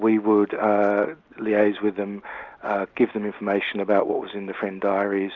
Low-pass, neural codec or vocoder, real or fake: 7.2 kHz; none; real